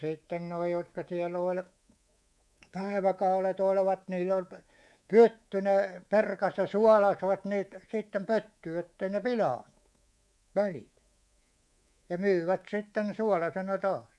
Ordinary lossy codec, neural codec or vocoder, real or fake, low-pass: none; codec, 24 kHz, 3.1 kbps, DualCodec; fake; none